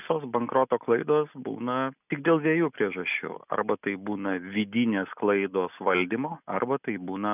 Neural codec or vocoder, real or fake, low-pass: none; real; 3.6 kHz